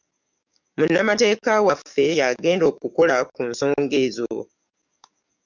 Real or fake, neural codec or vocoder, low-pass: fake; codec, 44.1 kHz, 7.8 kbps, DAC; 7.2 kHz